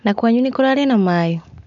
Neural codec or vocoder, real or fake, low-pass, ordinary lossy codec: none; real; 7.2 kHz; none